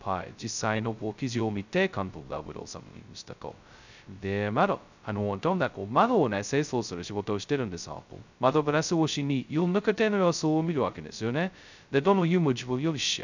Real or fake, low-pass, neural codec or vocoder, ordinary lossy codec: fake; 7.2 kHz; codec, 16 kHz, 0.2 kbps, FocalCodec; none